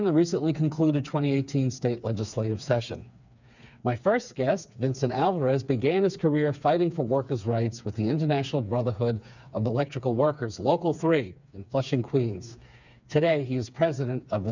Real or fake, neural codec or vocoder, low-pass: fake; codec, 16 kHz, 4 kbps, FreqCodec, smaller model; 7.2 kHz